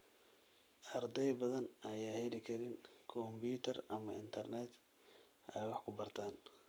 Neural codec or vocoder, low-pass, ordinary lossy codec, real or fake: codec, 44.1 kHz, 7.8 kbps, Pupu-Codec; none; none; fake